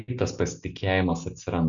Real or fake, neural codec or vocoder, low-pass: real; none; 7.2 kHz